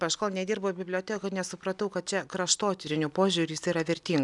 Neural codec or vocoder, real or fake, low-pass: none; real; 10.8 kHz